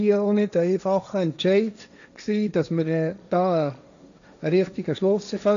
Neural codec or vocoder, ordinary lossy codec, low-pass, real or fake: codec, 16 kHz, 1.1 kbps, Voila-Tokenizer; none; 7.2 kHz; fake